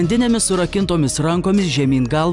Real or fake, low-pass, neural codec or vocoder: real; 10.8 kHz; none